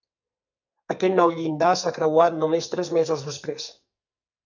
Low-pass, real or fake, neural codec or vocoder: 7.2 kHz; fake; codec, 44.1 kHz, 2.6 kbps, SNAC